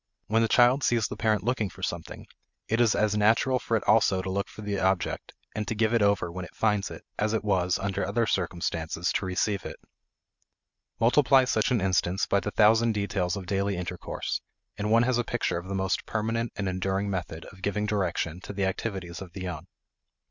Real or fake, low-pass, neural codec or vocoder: real; 7.2 kHz; none